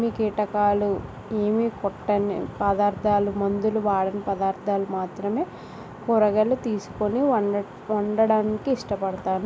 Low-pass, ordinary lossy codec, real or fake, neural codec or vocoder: none; none; real; none